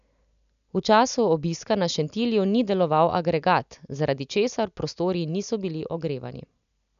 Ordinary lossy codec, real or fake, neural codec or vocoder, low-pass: none; real; none; 7.2 kHz